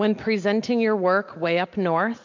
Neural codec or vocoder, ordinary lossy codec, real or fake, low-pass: none; MP3, 48 kbps; real; 7.2 kHz